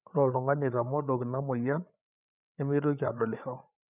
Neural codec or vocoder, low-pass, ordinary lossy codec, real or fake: codec, 16 kHz, 8 kbps, FreqCodec, larger model; 3.6 kHz; none; fake